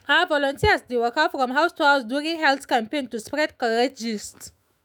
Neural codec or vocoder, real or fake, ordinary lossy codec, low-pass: autoencoder, 48 kHz, 128 numbers a frame, DAC-VAE, trained on Japanese speech; fake; none; none